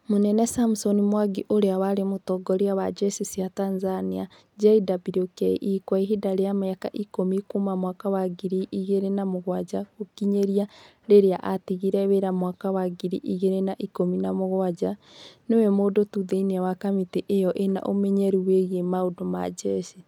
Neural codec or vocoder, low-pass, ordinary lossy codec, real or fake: none; 19.8 kHz; none; real